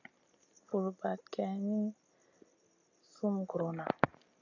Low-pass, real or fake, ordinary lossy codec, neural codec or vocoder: 7.2 kHz; real; AAC, 48 kbps; none